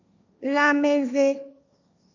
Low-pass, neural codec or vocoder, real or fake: 7.2 kHz; codec, 16 kHz, 1.1 kbps, Voila-Tokenizer; fake